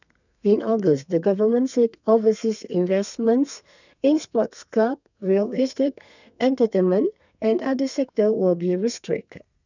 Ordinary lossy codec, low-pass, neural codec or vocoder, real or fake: none; 7.2 kHz; codec, 32 kHz, 1.9 kbps, SNAC; fake